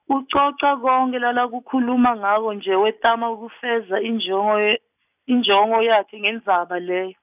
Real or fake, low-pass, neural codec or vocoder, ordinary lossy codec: real; 3.6 kHz; none; none